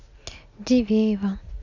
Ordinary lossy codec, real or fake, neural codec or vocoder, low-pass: none; real; none; 7.2 kHz